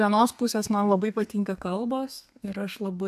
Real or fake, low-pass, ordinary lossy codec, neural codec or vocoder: fake; 14.4 kHz; AAC, 96 kbps; codec, 32 kHz, 1.9 kbps, SNAC